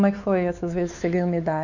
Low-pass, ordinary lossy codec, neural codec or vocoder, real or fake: 7.2 kHz; none; codec, 16 kHz, 4 kbps, X-Codec, WavLM features, trained on Multilingual LibriSpeech; fake